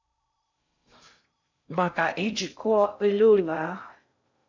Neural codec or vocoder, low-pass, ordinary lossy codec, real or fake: codec, 16 kHz in and 24 kHz out, 0.6 kbps, FocalCodec, streaming, 4096 codes; 7.2 kHz; MP3, 64 kbps; fake